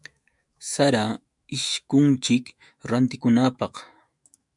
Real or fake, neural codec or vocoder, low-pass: fake; autoencoder, 48 kHz, 128 numbers a frame, DAC-VAE, trained on Japanese speech; 10.8 kHz